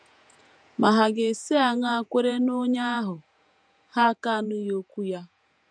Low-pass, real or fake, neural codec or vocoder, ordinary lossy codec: 9.9 kHz; fake; vocoder, 48 kHz, 128 mel bands, Vocos; none